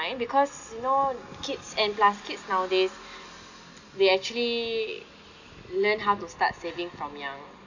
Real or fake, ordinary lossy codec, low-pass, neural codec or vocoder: real; none; 7.2 kHz; none